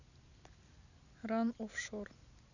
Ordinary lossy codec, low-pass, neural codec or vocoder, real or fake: none; 7.2 kHz; none; real